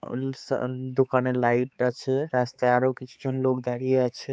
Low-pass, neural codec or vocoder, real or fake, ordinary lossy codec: none; codec, 16 kHz, 4 kbps, X-Codec, HuBERT features, trained on balanced general audio; fake; none